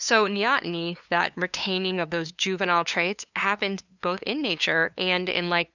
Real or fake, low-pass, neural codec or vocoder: fake; 7.2 kHz; codec, 16 kHz, 2 kbps, FunCodec, trained on LibriTTS, 25 frames a second